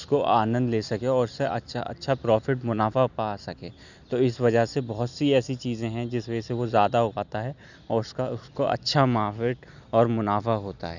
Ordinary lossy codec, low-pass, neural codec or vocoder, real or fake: none; 7.2 kHz; none; real